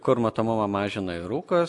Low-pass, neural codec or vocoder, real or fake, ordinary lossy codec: 10.8 kHz; none; real; AAC, 64 kbps